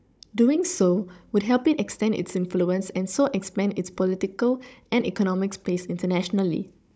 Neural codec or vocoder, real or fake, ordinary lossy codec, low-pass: codec, 16 kHz, 16 kbps, FunCodec, trained on Chinese and English, 50 frames a second; fake; none; none